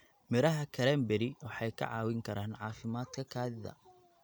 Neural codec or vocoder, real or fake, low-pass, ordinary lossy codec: none; real; none; none